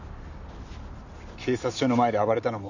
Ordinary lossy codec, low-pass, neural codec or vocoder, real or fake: none; 7.2 kHz; none; real